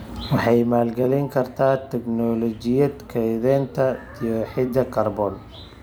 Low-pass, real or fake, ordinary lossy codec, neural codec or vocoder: none; fake; none; vocoder, 44.1 kHz, 128 mel bands every 256 samples, BigVGAN v2